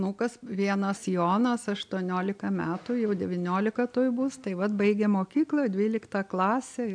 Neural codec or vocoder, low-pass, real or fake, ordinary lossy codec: none; 9.9 kHz; real; AAC, 64 kbps